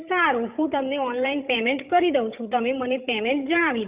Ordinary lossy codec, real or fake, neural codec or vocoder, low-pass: Opus, 64 kbps; fake; codec, 16 kHz, 8 kbps, FreqCodec, larger model; 3.6 kHz